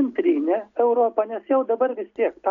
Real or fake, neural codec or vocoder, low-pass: real; none; 7.2 kHz